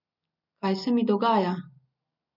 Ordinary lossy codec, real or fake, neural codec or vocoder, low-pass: none; real; none; 5.4 kHz